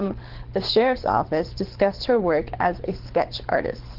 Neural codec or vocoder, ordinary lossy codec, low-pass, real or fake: codec, 24 kHz, 6 kbps, HILCodec; Opus, 16 kbps; 5.4 kHz; fake